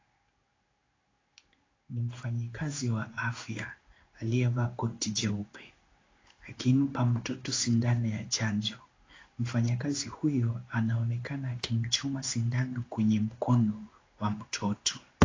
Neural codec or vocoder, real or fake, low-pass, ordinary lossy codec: codec, 16 kHz in and 24 kHz out, 1 kbps, XY-Tokenizer; fake; 7.2 kHz; AAC, 32 kbps